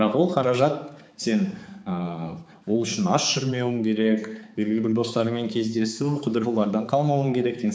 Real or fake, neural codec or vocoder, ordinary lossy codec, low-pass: fake; codec, 16 kHz, 4 kbps, X-Codec, HuBERT features, trained on balanced general audio; none; none